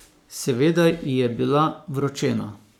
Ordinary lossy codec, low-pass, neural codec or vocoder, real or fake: none; 19.8 kHz; codec, 44.1 kHz, 7.8 kbps, Pupu-Codec; fake